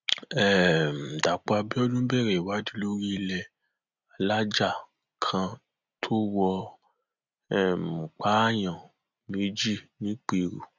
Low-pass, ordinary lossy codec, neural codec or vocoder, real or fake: 7.2 kHz; none; none; real